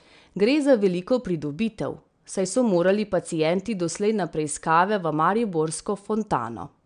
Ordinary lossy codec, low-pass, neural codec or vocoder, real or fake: MP3, 96 kbps; 9.9 kHz; none; real